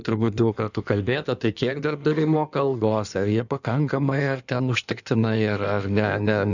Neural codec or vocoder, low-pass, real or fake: codec, 16 kHz in and 24 kHz out, 1.1 kbps, FireRedTTS-2 codec; 7.2 kHz; fake